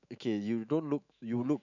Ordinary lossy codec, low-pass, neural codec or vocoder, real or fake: none; 7.2 kHz; autoencoder, 48 kHz, 128 numbers a frame, DAC-VAE, trained on Japanese speech; fake